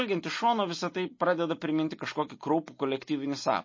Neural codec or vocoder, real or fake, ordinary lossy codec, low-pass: none; real; MP3, 32 kbps; 7.2 kHz